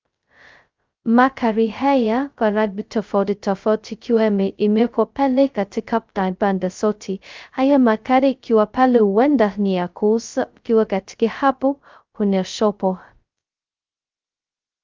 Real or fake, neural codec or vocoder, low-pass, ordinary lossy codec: fake; codec, 16 kHz, 0.2 kbps, FocalCodec; 7.2 kHz; Opus, 24 kbps